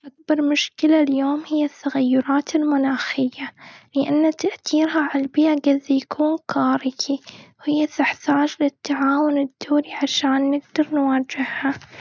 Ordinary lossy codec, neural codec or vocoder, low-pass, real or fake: none; none; none; real